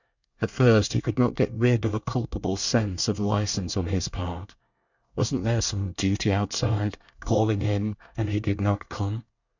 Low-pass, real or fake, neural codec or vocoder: 7.2 kHz; fake; codec, 24 kHz, 1 kbps, SNAC